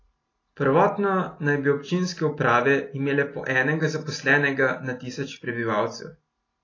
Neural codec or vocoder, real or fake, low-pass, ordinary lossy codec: none; real; 7.2 kHz; AAC, 32 kbps